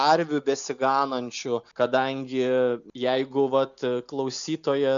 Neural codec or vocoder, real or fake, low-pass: none; real; 7.2 kHz